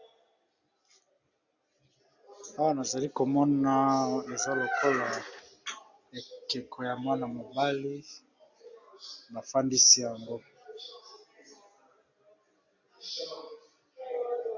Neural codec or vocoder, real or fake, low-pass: none; real; 7.2 kHz